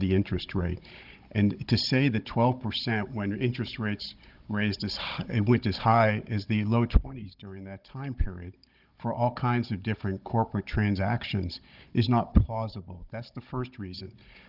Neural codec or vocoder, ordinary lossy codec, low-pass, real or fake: codec, 16 kHz, 16 kbps, FunCodec, trained on Chinese and English, 50 frames a second; Opus, 32 kbps; 5.4 kHz; fake